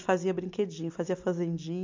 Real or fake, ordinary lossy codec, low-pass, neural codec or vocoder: real; MP3, 64 kbps; 7.2 kHz; none